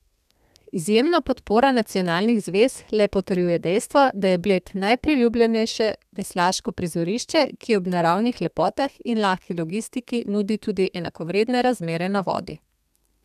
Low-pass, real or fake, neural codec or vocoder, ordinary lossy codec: 14.4 kHz; fake; codec, 32 kHz, 1.9 kbps, SNAC; none